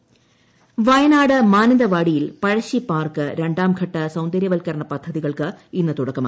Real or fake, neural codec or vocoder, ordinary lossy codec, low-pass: real; none; none; none